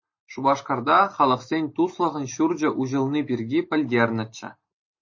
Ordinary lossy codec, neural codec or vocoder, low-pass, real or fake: MP3, 32 kbps; none; 7.2 kHz; real